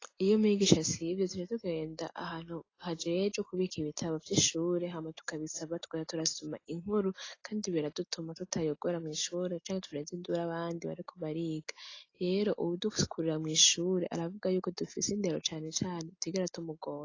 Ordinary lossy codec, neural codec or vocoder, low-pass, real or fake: AAC, 32 kbps; none; 7.2 kHz; real